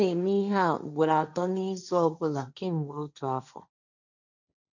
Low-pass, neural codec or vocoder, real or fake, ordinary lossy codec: 7.2 kHz; codec, 16 kHz, 1.1 kbps, Voila-Tokenizer; fake; none